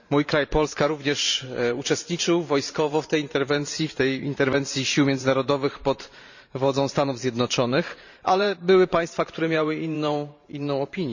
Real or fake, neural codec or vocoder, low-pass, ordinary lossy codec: fake; vocoder, 44.1 kHz, 128 mel bands every 512 samples, BigVGAN v2; 7.2 kHz; none